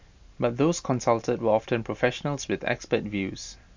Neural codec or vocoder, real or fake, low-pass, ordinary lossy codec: none; real; 7.2 kHz; MP3, 64 kbps